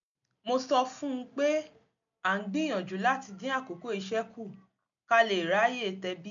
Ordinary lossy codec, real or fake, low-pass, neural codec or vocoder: none; real; 7.2 kHz; none